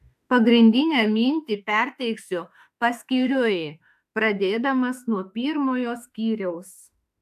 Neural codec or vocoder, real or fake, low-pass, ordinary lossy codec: autoencoder, 48 kHz, 32 numbers a frame, DAC-VAE, trained on Japanese speech; fake; 14.4 kHz; AAC, 96 kbps